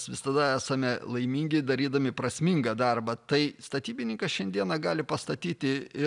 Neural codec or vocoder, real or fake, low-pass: none; real; 10.8 kHz